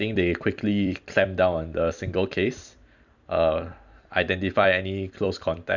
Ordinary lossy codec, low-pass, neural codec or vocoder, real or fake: none; 7.2 kHz; vocoder, 44.1 kHz, 128 mel bands every 256 samples, BigVGAN v2; fake